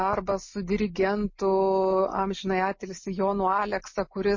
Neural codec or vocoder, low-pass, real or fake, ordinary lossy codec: none; 7.2 kHz; real; MP3, 32 kbps